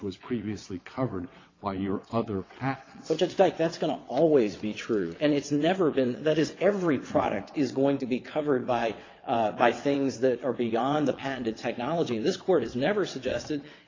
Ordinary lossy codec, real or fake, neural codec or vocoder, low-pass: AAC, 32 kbps; fake; vocoder, 22.05 kHz, 80 mel bands, WaveNeXt; 7.2 kHz